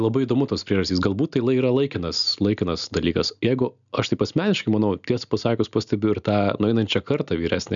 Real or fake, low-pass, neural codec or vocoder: real; 7.2 kHz; none